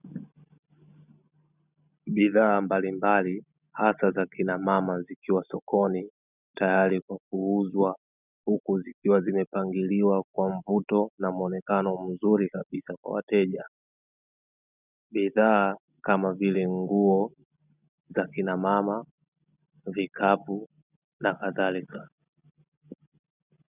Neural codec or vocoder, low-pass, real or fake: none; 3.6 kHz; real